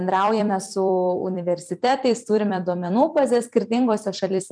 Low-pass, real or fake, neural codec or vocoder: 9.9 kHz; fake; vocoder, 44.1 kHz, 128 mel bands every 256 samples, BigVGAN v2